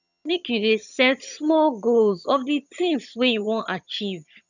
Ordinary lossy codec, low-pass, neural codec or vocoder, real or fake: none; 7.2 kHz; vocoder, 22.05 kHz, 80 mel bands, HiFi-GAN; fake